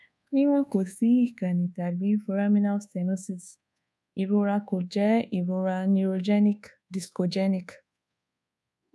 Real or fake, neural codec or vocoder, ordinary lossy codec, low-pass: fake; codec, 24 kHz, 1.2 kbps, DualCodec; none; none